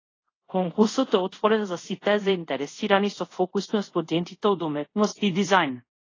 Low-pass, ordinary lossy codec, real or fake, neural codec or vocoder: 7.2 kHz; AAC, 32 kbps; fake; codec, 24 kHz, 0.5 kbps, DualCodec